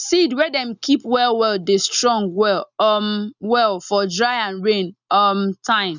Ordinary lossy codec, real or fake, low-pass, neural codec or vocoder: none; real; 7.2 kHz; none